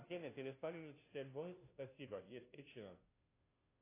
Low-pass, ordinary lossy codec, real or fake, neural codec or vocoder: 3.6 kHz; AAC, 24 kbps; fake; codec, 16 kHz, 0.5 kbps, FunCodec, trained on Chinese and English, 25 frames a second